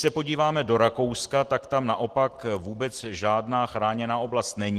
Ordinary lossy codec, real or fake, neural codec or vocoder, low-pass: Opus, 16 kbps; real; none; 14.4 kHz